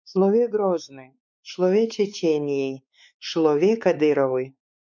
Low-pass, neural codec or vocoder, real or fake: 7.2 kHz; codec, 16 kHz, 4 kbps, X-Codec, WavLM features, trained on Multilingual LibriSpeech; fake